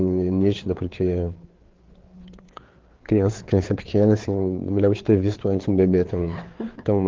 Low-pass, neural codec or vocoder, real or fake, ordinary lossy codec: 7.2 kHz; codec, 16 kHz, 4 kbps, FreqCodec, larger model; fake; Opus, 16 kbps